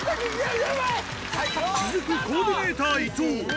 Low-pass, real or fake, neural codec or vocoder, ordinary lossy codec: none; real; none; none